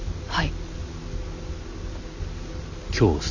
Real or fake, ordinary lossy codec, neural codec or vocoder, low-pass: real; none; none; 7.2 kHz